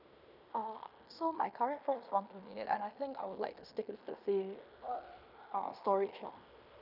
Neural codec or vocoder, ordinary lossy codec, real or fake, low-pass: codec, 16 kHz in and 24 kHz out, 0.9 kbps, LongCat-Audio-Codec, fine tuned four codebook decoder; none; fake; 5.4 kHz